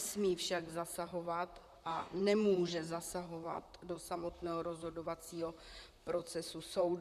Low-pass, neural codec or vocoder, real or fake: 14.4 kHz; vocoder, 44.1 kHz, 128 mel bands, Pupu-Vocoder; fake